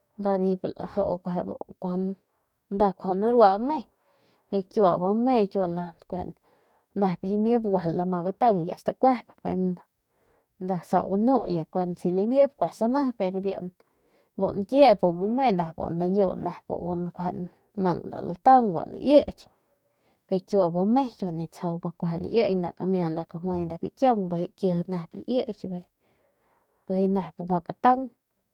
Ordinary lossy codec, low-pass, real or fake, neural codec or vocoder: none; 19.8 kHz; fake; codec, 44.1 kHz, 2.6 kbps, DAC